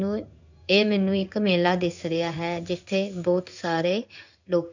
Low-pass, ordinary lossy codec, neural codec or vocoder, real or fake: 7.2 kHz; none; codec, 16 kHz in and 24 kHz out, 1 kbps, XY-Tokenizer; fake